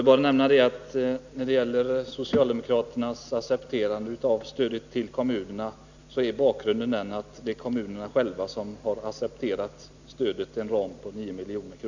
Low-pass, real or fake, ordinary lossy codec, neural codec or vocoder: 7.2 kHz; real; none; none